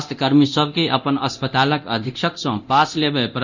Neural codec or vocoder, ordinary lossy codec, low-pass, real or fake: codec, 24 kHz, 0.9 kbps, DualCodec; none; 7.2 kHz; fake